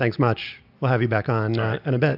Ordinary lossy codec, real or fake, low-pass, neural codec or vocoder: AAC, 48 kbps; real; 5.4 kHz; none